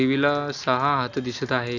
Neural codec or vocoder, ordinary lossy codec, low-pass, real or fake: none; none; 7.2 kHz; real